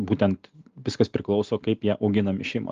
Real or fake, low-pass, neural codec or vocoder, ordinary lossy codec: real; 7.2 kHz; none; Opus, 32 kbps